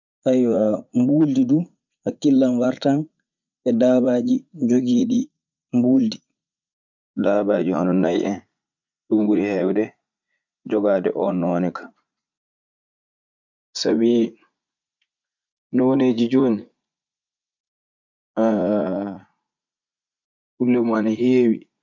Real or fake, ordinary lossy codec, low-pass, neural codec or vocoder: fake; none; 7.2 kHz; vocoder, 44.1 kHz, 80 mel bands, Vocos